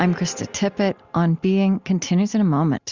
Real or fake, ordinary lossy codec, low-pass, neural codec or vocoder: real; Opus, 64 kbps; 7.2 kHz; none